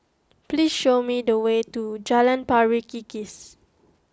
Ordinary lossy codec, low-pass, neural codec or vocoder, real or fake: none; none; none; real